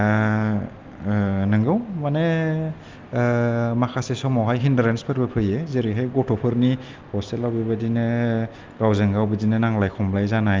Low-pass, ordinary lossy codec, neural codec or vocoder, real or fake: 7.2 kHz; Opus, 32 kbps; none; real